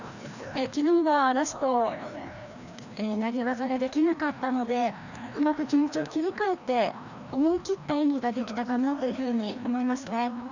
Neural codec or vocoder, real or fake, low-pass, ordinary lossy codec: codec, 16 kHz, 1 kbps, FreqCodec, larger model; fake; 7.2 kHz; none